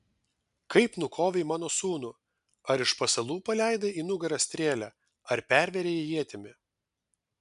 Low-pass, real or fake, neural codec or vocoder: 10.8 kHz; real; none